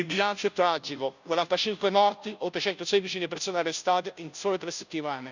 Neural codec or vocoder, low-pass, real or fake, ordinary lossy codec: codec, 16 kHz, 0.5 kbps, FunCodec, trained on Chinese and English, 25 frames a second; 7.2 kHz; fake; none